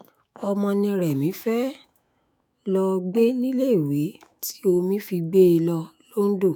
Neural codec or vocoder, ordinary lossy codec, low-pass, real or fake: autoencoder, 48 kHz, 128 numbers a frame, DAC-VAE, trained on Japanese speech; none; none; fake